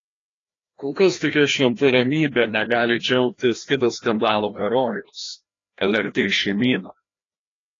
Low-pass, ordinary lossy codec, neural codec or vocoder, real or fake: 7.2 kHz; AAC, 32 kbps; codec, 16 kHz, 1 kbps, FreqCodec, larger model; fake